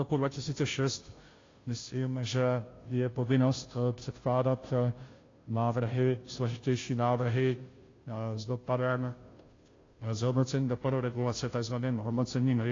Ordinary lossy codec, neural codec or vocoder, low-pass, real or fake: AAC, 32 kbps; codec, 16 kHz, 0.5 kbps, FunCodec, trained on Chinese and English, 25 frames a second; 7.2 kHz; fake